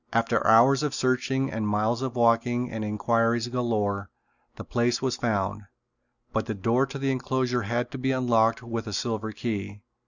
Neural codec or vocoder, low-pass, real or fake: none; 7.2 kHz; real